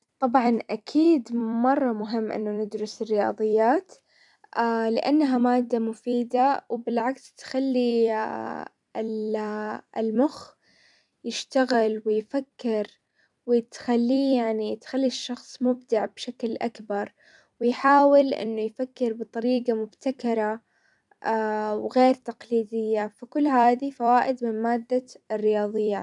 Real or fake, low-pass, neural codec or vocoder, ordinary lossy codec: fake; 10.8 kHz; vocoder, 44.1 kHz, 128 mel bands every 256 samples, BigVGAN v2; none